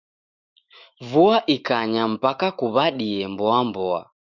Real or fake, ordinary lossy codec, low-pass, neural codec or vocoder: fake; Opus, 64 kbps; 7.2 kHz; autoencoder, 48 kHz, 128 numbers a frame, DAC-VAE, trained on Japanese speech